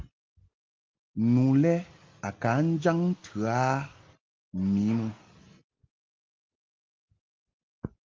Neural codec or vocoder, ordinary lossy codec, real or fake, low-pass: none; Opus, 32 kbps; real; 7.2 kHz